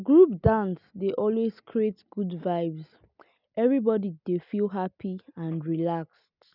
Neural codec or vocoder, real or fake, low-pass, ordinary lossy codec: none; real; 5.4 kHz; none